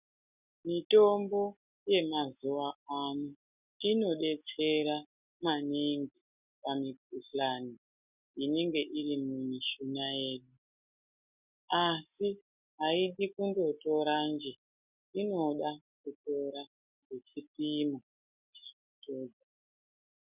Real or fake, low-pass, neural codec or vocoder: real; 3.6 kHz; none